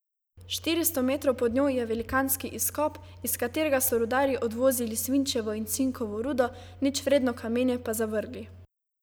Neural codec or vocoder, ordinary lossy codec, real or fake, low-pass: none; none; real; none